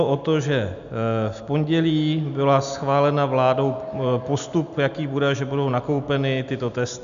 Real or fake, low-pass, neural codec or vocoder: real; 7.2 kHz; none